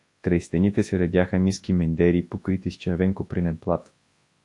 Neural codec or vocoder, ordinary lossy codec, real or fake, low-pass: codec, 24 kHz, 0.9 kbps, WavTokenizer, large speech release; AAC, 48 kbps; fake; 10.8 kHz